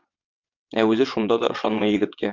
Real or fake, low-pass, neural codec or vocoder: fake; 7.2 kHz; vocoder, 22.05 kHz, 80 mel bands, WaveNeXt